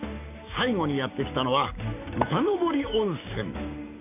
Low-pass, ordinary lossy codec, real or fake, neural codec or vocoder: 3.6 kHz; none; fake; codec, 16 kHz, 6 kbps, DAC